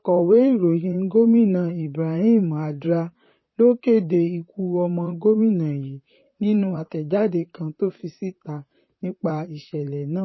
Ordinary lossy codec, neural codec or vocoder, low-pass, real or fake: MP3, 24 kbps; vocoder, 44.1 kHz, 128 mel bands, Pupu-Vocoder; 7.2 kHz; fake